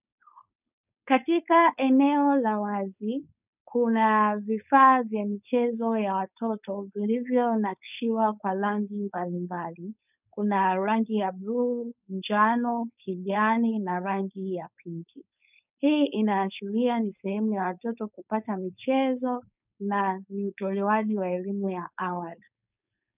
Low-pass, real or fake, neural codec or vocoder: 3.6 kHz; fake; codec, 16 kHz, 4.8 kbps, FACodec